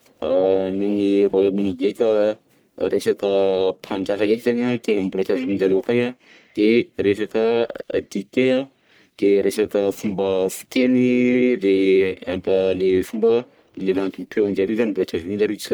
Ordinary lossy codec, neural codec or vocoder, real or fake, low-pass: none; codec, 44.1 kHz, 1.7 kbps, Pupu-Codec; fake; none